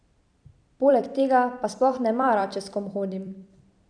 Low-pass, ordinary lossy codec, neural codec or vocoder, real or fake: 9.9 kHz; none; vocoder, 44.1 kHz, 128 mel bands every 256 samples, BigVGAN v2; fake